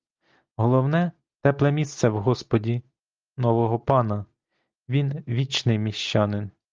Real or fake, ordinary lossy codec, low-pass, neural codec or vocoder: real; Opus, 32 kbps; 7.2 kHz; none